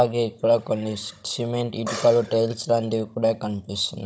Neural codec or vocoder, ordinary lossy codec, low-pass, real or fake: codec, 16 kHz, 16 kbps, FunCodec, trained on Chinese and English, 50 frames a second; none; none; fake